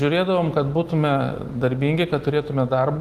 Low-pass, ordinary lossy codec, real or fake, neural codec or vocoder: 14.4 kHz; Opus, 24 kbps; real; none